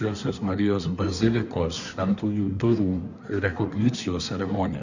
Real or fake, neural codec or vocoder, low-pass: fake; codec, 24 kHz, 1 kbps, SNAC; 7.2 kHz